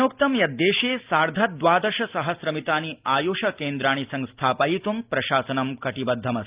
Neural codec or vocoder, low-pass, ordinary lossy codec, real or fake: none; 3.6 kHz; Opus, 24 kbps; real